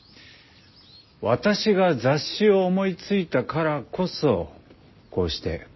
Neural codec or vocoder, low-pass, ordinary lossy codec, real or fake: none; 7.2 kHz; MP3, 24 kbps; real